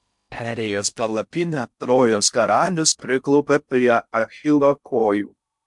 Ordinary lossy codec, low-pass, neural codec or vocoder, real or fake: MP3, 64 kbps; 10.8 kHz; codec, 16 kHz in and 24 kHz out, 0.6 kbps, FocalCodec, streaming, 2048 codes; fake